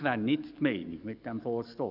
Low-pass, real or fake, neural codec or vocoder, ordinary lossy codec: 5.4 kHz; fake; codec, 44.1 kHz, 7.8 kbps, DAC; none